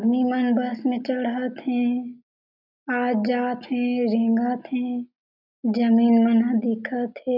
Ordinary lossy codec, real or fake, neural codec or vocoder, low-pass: none; real; none; 5.4 kHz